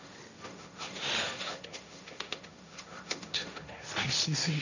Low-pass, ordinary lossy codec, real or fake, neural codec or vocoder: 7.2 kHz; none; fake; codec, 16 kHz, 1.1 kbps, Voila-Tokenizer